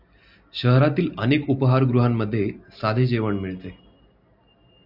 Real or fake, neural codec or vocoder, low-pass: real; none; 5.4 kHz